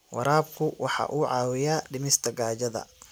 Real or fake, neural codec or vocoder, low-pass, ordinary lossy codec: real; none; none; none